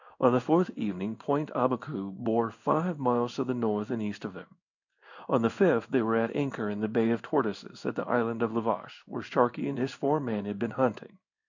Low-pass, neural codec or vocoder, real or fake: 7.2 kHz; codec, 16 kHz in and 24 kHz out, 1 kbps, XY-Tokenizer; fake